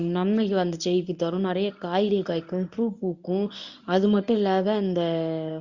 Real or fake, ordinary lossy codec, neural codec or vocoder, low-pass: fake; Opus, 64 kbps; codec, 24 kHz, 0.9 kbps, WavTokenizer, medium speech release version 1; 7.2 kHz